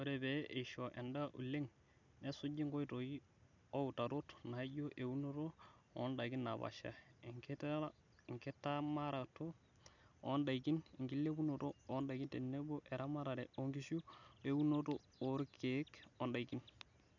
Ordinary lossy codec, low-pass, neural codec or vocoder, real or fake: none; 7.2 kHz; none; real